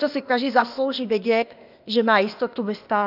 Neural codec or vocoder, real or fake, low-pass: codec, 16 kHz, 0.8 kbps, ZipCodec; fake; 5.4 kHz